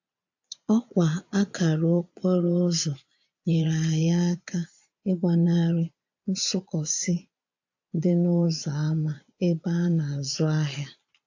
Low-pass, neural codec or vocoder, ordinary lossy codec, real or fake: 7.2 kHz; vocoder, 22.05 kHz, 80 mel bands, Vocos; AAC, 48 kbps; fake